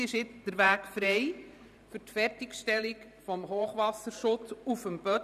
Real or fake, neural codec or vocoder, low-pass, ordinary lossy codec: fake; vocoder, 44.1 kHz, 128 mel bands every 512 samples, BigVGAN v2; 14.4 kHz; none